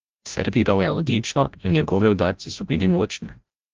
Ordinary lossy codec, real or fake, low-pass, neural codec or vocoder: Opus, 32 kbps; fake; 7.2 kHz; codec, 16 kHz, 0.5 kbps, FreqCodec, larger model